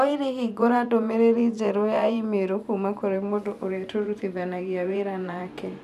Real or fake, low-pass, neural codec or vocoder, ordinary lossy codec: fake; 14.4 kHz; vocoder, 44.1 kHz, 128 mel bands every 512 samples, BigVGAN v2; none